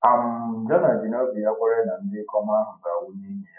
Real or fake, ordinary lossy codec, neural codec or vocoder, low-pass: real; none; none; 3.6 kHz